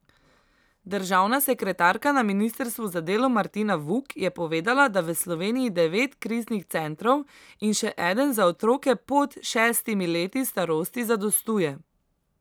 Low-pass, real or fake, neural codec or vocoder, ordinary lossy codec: none; real; none; none